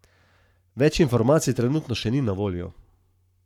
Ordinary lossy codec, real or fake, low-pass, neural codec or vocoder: none; fake; 19.8 kHz; codec, 44.1 kHz, 7.8 kbps, Pupu-Codec